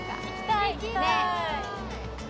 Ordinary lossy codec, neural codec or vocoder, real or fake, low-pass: none; none; real; none